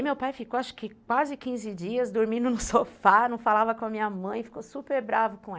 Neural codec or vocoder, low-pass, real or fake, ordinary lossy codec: none; none; real; none